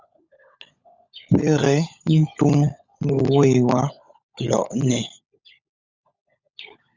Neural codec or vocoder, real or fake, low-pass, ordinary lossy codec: codec, 16 kHz, 16 kbps, FunCodec, trained on LibriTTS, 50 frames a second; fake; 7.2 kHz; Opus, 64 kbps